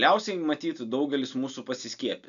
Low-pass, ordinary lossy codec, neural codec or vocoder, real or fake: 7.2 kHz; AAC, 96 kbps; none; real